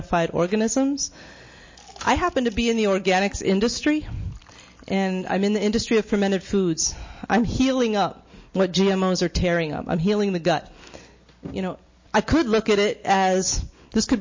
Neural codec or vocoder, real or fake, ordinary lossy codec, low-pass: none; real; MP3, 32 kbps; 7.2 kHz